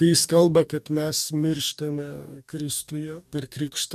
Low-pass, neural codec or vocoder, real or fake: 14.4 kHz; codec, 44.1 kHz, 2.6 kbps, DAC; fake